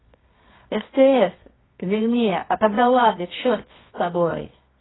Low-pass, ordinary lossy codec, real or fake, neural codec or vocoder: 7.2 kHz; AAC, 16 kbps; fake; codec, 24 kHz, 0.9 kbps, WavTokenizer, medium music audio release